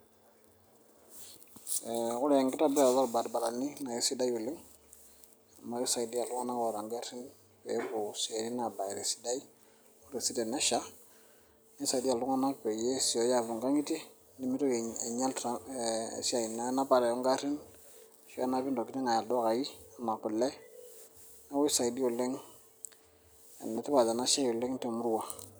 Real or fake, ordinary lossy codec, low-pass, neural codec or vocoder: real; none; none; none